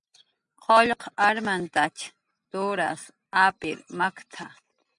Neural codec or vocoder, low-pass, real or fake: none; 10.8 kHz; real